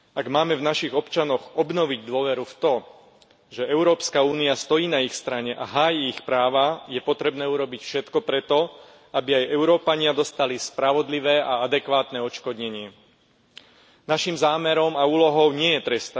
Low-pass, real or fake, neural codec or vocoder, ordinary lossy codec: none; real; none; none